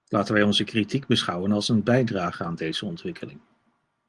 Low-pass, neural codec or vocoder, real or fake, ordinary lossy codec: 10.8 kHz; none; real; Opus, 24 kbps